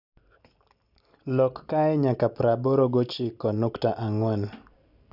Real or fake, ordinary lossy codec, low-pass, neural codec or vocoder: real; none; 5.4 kHz; none